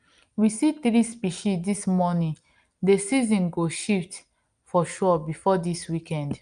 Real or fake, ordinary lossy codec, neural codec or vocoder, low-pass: real; Opus, 32 kbps; none; 9.9 kHz